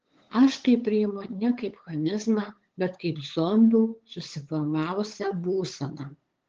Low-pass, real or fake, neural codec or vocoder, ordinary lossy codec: 7.2 kHz; fake; codec, 16 kHz, 8 kbps, FunCodec, trained on LibriTTS, 25 frames a second; Opus, 16 kbps